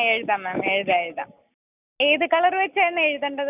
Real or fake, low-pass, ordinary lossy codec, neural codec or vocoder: real; 3.6 kHz; none; none